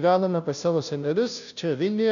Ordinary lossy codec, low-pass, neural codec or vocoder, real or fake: MP3, 96 kbps; 7.2 kHz; codec, 16 kHz, 0.5 kbps, FunCodec, trained on Chinese and English, 25 frames a second; fake